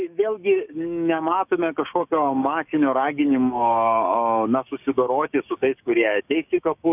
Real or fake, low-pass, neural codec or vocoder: fake; 3.6 kHz; codec, 16 kHz, 6 kbps, DAC